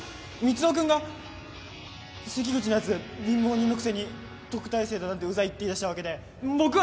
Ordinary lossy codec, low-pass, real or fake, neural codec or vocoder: none; none; real; none